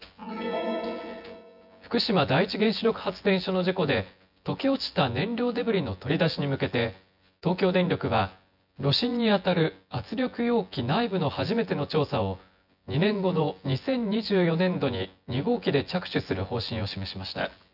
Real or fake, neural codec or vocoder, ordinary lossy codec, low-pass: fake; vocoder, 24 kHz, 100 mel bands, Vocos; none; 5.4 kHz